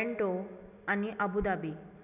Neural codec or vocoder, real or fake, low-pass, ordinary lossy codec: none; real; 3.6 kHz; none